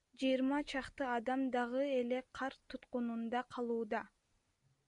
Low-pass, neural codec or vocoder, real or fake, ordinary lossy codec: 10.8 kHz; none; real; MP3, 96 kbps